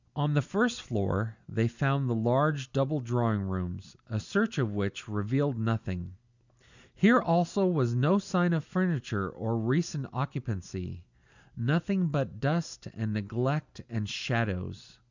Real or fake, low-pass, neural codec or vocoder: real; 7.2 kHz; none